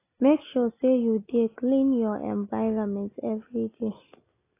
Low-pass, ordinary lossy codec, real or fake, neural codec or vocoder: 3.6 kHz; AAC, 24 kbps; real; none